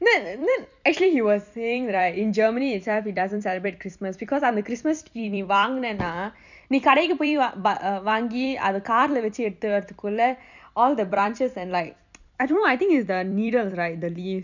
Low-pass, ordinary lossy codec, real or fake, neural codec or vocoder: 7.2 kHz; none; fake; vocoder, 44.1 kHz, 128 mel bands every 256 samples, BigVGAN v2